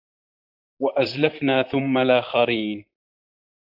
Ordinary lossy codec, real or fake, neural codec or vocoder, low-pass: AAC, 48 kbps; fake; vocoder, 44.1 kHz, 128 mel bands, Pupu-Vocoder; 5.4 kHz